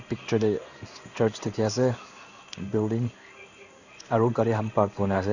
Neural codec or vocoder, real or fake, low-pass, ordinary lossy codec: codec, 16 kHz in and 24 kHz out, 1 kbps, XY-Tokenizer; fake; 7.2 kHz; none